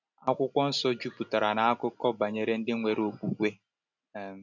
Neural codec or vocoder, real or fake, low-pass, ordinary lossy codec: none; real; 7.2 kHz; none